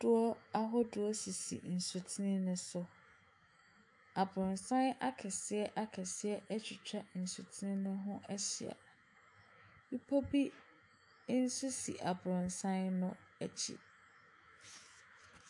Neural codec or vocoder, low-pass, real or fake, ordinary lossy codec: autoencoder, 48 kHz, 128 numbers a frame, DAC-VAE, trained on Japanese speech; 10.8 kHz; fake; AAC, 64 kbps